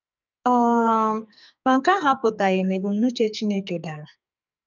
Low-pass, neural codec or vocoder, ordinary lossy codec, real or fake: 7.2 kHz; codec, 44.1 kHz, 2.6 kbps, SNAC; none; fake